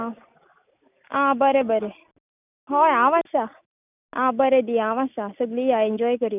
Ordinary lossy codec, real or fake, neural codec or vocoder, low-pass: none; real; none; 3.6 kHz